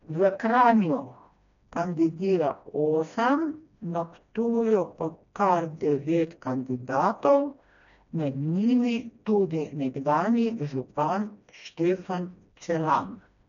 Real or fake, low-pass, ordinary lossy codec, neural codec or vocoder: fake; 7.2 kHz; none; codec, 16 kHz, 1 kbps, FreqCodec, smaller model